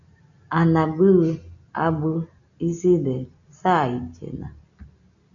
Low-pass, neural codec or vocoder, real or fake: 7.2 kHz; none; real